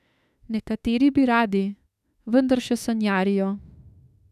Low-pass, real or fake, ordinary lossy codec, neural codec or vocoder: 14.4 kHz; fake; none; autoencoder, 48 kHz, 32 numbers a frame, DAC-VAE, trained on Japanese speech